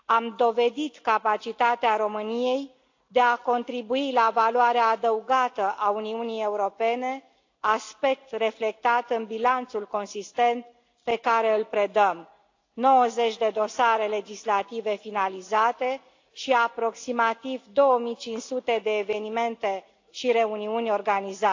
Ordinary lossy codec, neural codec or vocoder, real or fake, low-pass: AAC, 48 kbps; none; real; 7.2 kHz